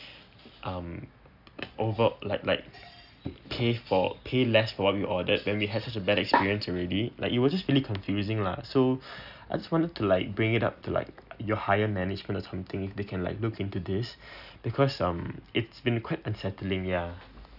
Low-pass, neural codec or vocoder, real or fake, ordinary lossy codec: 5.4 kHz; none; real; none